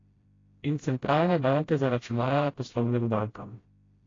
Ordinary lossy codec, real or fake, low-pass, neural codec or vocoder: AAC, 32 kbps; fake; 7.2 kHz; codec, 16 kHz, 0.5 kbps, FreqCodec, smaller model